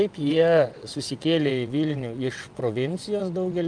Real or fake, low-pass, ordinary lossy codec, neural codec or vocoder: fake; 9.9 kHz; Opus, 32 kbps; vocoder, 22.05 kHz, 80 mel bands, WaveNeXt